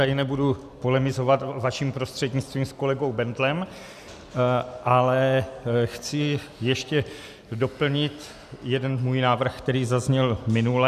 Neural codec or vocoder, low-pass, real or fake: none; 14.4 kHz; real